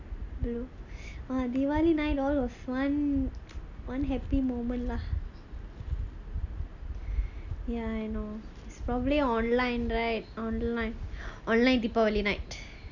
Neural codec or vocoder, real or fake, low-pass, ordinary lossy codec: none; real; 7.2 kHz; none